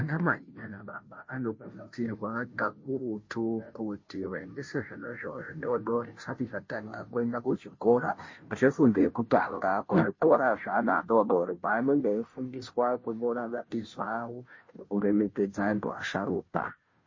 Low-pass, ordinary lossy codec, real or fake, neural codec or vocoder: 7.2 kHz; MP3, 32 kbps; fake; codec, 16 kHz, 0.5 kbps, FunCodec, trained on Chinese and English, 25 frames a second